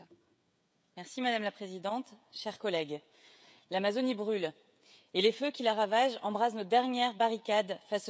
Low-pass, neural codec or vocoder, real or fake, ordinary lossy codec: none; codec, 16 kHz, 16 kbps, FreqCodec, smaller model; fake; none